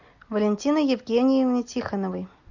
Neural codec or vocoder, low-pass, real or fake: none; 7.2 kHz; real